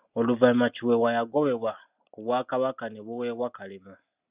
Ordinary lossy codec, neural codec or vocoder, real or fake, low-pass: Opus, 64 kbps; none; real; 3.6 kHz